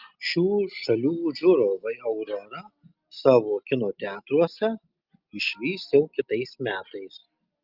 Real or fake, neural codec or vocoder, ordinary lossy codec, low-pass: real; none; Opus, 24 kbps; 5.4 kHz